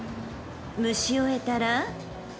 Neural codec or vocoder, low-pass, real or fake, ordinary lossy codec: none; none; real; none